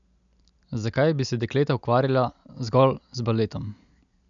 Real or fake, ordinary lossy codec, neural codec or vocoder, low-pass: real; none; none; 7.2 kHz